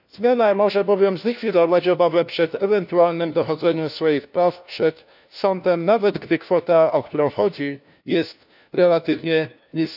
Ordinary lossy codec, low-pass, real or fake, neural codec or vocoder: none; 5.4 kHz; fake; codec, 16 kHz, 1 kbps, FunCodec, trained on LibriTTS, 50 frames a second